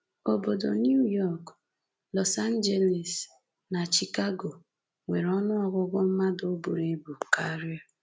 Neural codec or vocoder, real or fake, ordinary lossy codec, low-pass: none; real; none; none